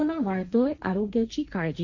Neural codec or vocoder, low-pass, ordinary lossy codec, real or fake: codec, 16 kHz, 1.1 kbps, Voila-Tokenizer; 7.2 kHz; none; fake